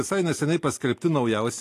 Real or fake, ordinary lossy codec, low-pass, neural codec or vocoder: real; AAC, 48 kbps; 14.4 kHz; none